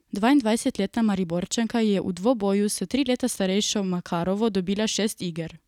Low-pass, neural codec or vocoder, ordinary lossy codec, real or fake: 19.8 kHz; none; none; real